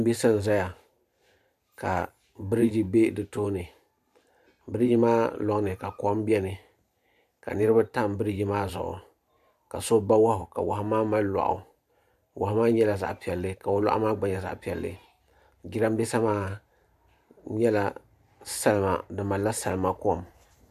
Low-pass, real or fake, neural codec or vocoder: 14.4 kHz; fake; vocoder, 44.1 kHz, 128 mel bands every 512 samples, BigVGAN v2